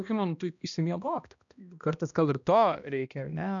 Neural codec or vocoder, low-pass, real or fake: codec, 16 kHz, 1 kbps, X-Codec, HuBERT features, trained on balanced general audio; 7.2 kHz; fake